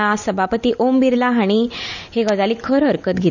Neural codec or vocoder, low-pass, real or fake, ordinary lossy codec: none; 7.2 kHz; real; none